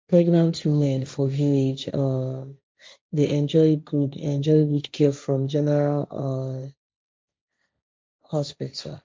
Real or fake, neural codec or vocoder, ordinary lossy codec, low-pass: fake; codec, 16 kHz, 1.1 kbps, Voila-Tokenizer; none; none